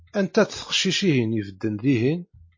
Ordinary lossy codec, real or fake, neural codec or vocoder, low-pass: MP3, 32 kbps; real; none; 7.2 kHz